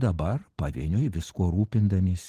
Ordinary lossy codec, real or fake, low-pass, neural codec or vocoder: Opus, 16 kbps; real; 14.4 kHz; none